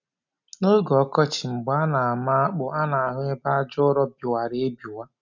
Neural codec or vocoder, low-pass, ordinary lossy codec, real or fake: none; 7.2 kHz; none; real